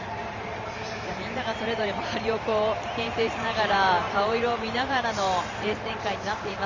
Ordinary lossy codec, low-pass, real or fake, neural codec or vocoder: Opus, 32 kbps; 7.2 kHz; fake; vocoder, 44.1 kHz, 128 mel bands every 512 samples, BigVGAN v2